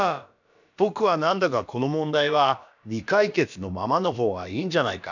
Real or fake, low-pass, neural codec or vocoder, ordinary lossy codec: fake; 7.2 kHz; codec, 16 kHz, about 1 kbps, DyCAST, with the encoder's durations; none